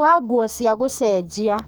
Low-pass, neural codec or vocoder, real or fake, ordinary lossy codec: none; codec, 44.1 kHz, 2.6 kbps, SNAC; fake; none